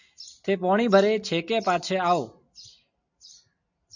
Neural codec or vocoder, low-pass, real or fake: none; 7.2 kHz; real